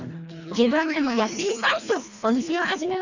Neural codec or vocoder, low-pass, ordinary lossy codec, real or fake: codec, 24 kHz, 1.5 kbps, HILCodec; 7.2 kHz; none; fake